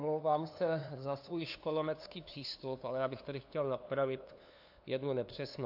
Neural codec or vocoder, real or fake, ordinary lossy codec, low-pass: codec, 16 kHz, 2 kbps, FunCodec, trained on LibriTTS, 25 frames a second; fake; AAC, 48 kbps; 5.4 kHz